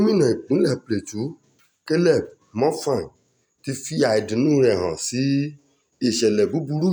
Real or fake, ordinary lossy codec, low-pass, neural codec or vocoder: real; none; none; none